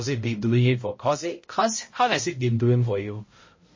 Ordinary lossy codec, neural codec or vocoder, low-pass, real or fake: MP3, 32 kbps; codec, 16 kHz, 0.5 kbps, X-Codec, HuBERT features, trained on balanced general audio; 7.2 kHz; fake